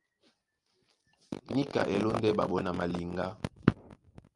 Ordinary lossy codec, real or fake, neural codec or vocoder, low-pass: Opus, 32 kbps; real; none; 10.8 kHz